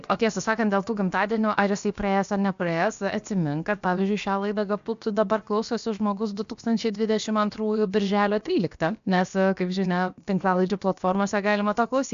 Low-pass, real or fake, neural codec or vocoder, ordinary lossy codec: 7.2 kHz; fake; codec, 16 kHz, about 1 kbps, DyCAST, with the encoder's durations; MP3, 64 kbps